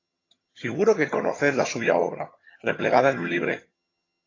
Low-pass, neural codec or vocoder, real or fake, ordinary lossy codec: 7.2 kHz; vocoder, 22.05 kHz, 80 mel bands, HiFi-GAN; fake; AAC, 32 kbps